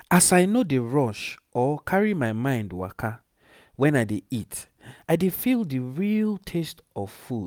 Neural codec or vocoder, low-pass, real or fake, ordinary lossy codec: none; none; real; none